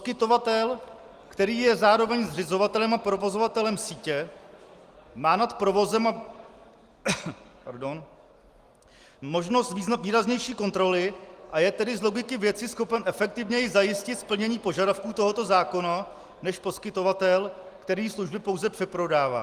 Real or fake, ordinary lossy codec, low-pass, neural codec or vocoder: real; Opus, 24 kbps; 14.4 kHz; none